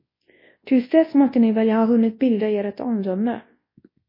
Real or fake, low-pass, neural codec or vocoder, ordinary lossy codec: fake; 5.4 kHz; codec, 24 kHz, 0.9 kbps, WavTokenizer, large speech release; MP3, 24 kbps